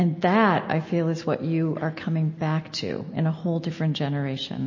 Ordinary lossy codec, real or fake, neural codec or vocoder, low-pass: MP3, 32 kbps; real; none; 7.2 kHz